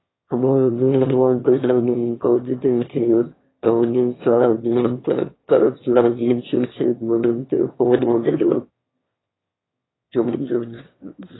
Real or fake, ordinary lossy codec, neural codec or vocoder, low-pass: fake; AAC, 16 kbps; autoencoder, 22.05 kHz, a latent of 192 numbers a frame, VITS, trained on one speaker; 7.2 kHz